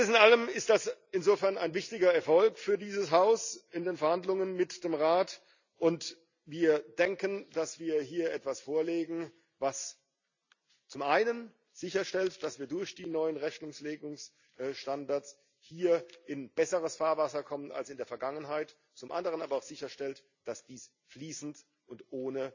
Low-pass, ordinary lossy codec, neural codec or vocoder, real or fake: 7.2 kHz; none; none; real